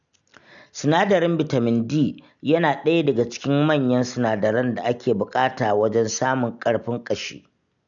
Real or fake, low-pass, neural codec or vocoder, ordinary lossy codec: real; 7.2 kHz; none; none